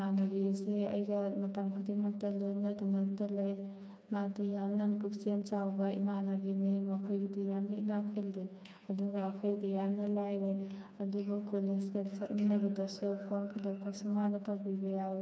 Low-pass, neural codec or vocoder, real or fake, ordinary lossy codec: none; codec, 16 kHz, 2 kbps, FreqCodec, smaller model; fake; none